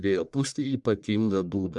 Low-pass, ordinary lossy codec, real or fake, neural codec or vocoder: 10.8 kHz; MP3, 96 kbps; fake; codec, 44.1 kHz, 1.7 kbps, Pupu-Codec